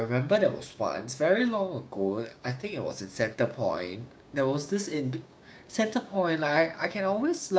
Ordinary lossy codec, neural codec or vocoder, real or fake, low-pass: none; codec, 16 kHz, 6 kbps, DAC; fake; none